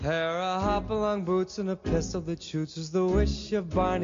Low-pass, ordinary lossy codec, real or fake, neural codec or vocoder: 7.2 kHz; MP3, 48 kbps; real; none